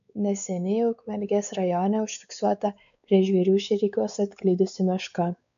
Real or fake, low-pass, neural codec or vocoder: fake; 7.2 kHz; codec, 16 kHz, 4 kbps, X-Codec, WavLM features, trained on Multilingual LibriSpeech